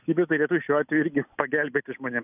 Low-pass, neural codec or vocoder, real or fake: 3.6 kHz; none; real